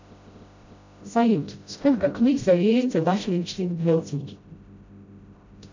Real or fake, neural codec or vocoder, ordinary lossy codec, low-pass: fake; codec, 16 kHz, 0.5 kbps, FreqCodec, smaller model; MP3, 64 kbps; 7.2 kHz